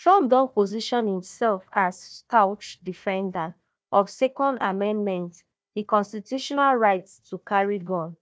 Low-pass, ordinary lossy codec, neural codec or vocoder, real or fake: none; none; codec, 16 kHz, 1 kbps, FunCodec, trained on Chinese and English, 50 frames a second; fake